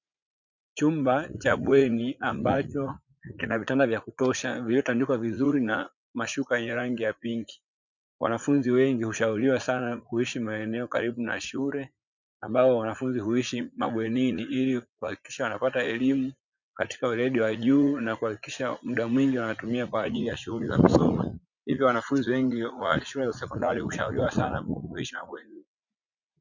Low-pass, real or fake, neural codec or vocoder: 7.2 kHz; fake; vocoder, 44.1 kHz, 80 mel bands, Vocos